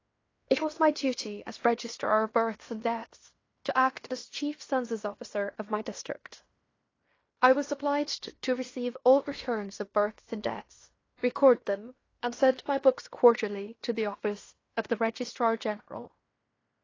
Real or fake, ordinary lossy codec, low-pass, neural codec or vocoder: fake; AAC, 32 kbps; 7.2 kHz; codec, 16 kHz in and 24 kHz out, 0.9 kbps, LongCat-Audio-Codec, fine tuned four codebook decoder